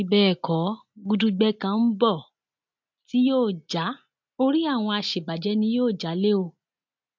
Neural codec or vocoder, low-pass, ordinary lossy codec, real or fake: none; 7.2 kHz; MP3, 64 kbps; real